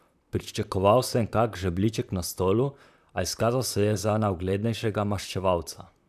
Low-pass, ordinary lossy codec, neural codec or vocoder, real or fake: 14.4 kHz; none; vocoder, 44.1 kHz, 128 mel bands, Pupu-Vocoder; fake